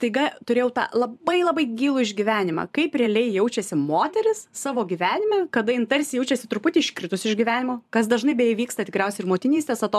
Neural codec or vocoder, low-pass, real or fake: vocoder, 44.1 kHz, 128 mel bands every 512 samples, BigVGAN v2; 14.4 kHz; fake